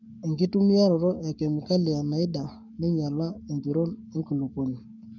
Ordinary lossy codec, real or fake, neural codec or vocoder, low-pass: none; fake; codec, 44.1 kHz, 7.8 kbps, Pupu-Codec; 7.2 kHz